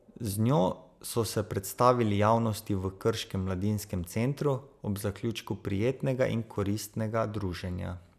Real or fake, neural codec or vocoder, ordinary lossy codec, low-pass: real; none; none; 14.4 kHz